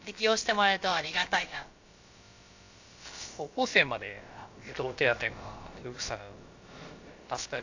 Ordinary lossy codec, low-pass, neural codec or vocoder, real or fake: AAC, 48 kbps; 7.2 kHz; codec, 16 kHz, about 1 kbps, DyCAST, with the encoder's durations; fake